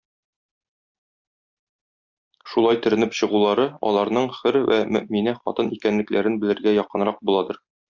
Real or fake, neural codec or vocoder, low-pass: real; none; 7.2 kHz